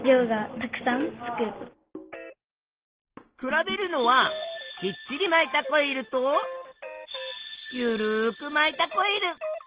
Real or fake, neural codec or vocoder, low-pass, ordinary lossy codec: real; none; 3.6 kHz; Opus, 16 kbps